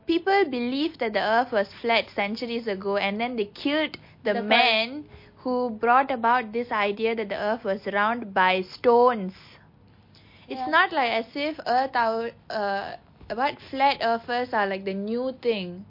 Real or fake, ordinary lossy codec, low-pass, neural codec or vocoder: real; MP3, 32 kbps; 5.4 kHz; none